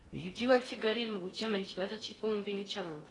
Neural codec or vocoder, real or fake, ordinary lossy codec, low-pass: codec, 16 kHz in and 24 kHz out, 0.6 kbps, FocalCodec, streaming, 4096 codes; fake; AAC, 32 kbps; 10.8 kHz